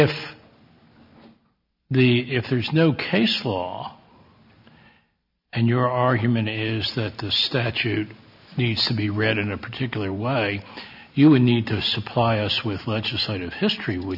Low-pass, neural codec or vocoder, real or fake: 5.4 kHz; none; real